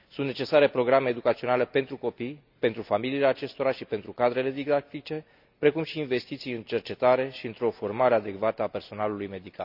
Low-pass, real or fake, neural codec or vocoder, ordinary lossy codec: 5.4 kHz; real; none; none